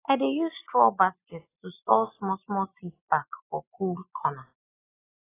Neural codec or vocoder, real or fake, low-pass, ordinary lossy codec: none; real; 3.6 kHz; AAC, 16 kbps